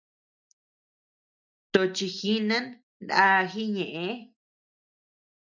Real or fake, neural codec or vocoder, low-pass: real; none; 7.2 kHz